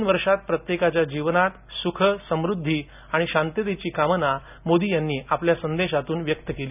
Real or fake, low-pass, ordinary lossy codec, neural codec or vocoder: real; 3.6 kHz; none; none